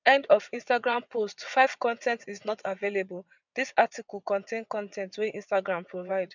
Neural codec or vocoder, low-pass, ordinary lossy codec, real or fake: vocoder, 22.05 kHz, 80 mel bands, WaveNeXt; 7.2 kHz; none; fake